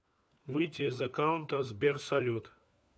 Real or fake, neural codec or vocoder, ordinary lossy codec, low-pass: fake; codec, 16 kHz, 4 kbps, FunCodec, trained on LibriTTS, 50 frames a second; none; none